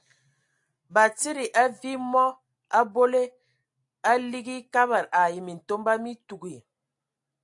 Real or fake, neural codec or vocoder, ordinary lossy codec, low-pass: real; none; AAC, 64 kbps; 10.8 kHz